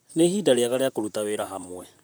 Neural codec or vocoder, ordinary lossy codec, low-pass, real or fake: vocoder, 44.1 kHz, 128 mel bands, Pupu-Vocoder; none; none; fake